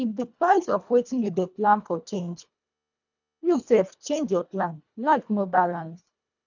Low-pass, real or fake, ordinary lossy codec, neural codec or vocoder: 7.2 kHz; fake; none; codec, 24 kHz, 1.5 kbps, HILCodec